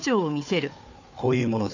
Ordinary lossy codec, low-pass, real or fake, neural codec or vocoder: none; 7.2 kHz; fake; codec, 16 kHz, 4 kbps, FunCodec, trained on Chinese and English, 50 frames a second